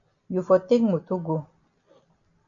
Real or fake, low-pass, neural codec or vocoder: real; 7.2 kHz; none